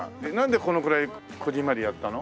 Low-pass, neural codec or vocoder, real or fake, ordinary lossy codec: none; none; real; none